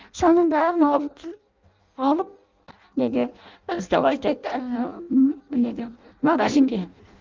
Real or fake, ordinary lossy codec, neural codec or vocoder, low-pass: fake; Opus, 24 kbps; codec, 16 kHz in and 24 kHz out, 0.6 kbps, FireRedTTS-2 codec; 7.2 kHz